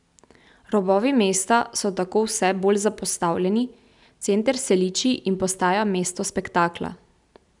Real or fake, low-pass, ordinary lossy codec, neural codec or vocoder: real; 10.8 kHz; none; none